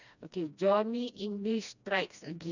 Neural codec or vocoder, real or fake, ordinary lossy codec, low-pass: codec, 16 kHz, 1 kbps, FreqCodec, smaller model; fake; none; 7.2 kHz